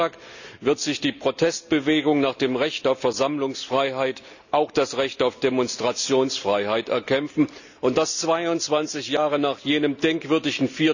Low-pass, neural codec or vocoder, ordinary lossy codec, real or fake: 7.2 kHz; none; none; real